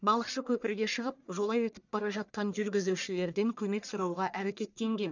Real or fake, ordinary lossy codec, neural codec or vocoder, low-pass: fake; none; codec, 44.1 kHz, 1.7 kbps, Pupu-Codec; 7.2 kHz